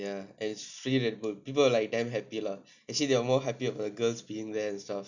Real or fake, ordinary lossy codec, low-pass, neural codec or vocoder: real; none; 7.2 kHz; none